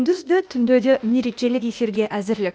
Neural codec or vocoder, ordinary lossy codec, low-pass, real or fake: codec, 16 kHz, 0.8 kbps, ZipCodec; none; none; fake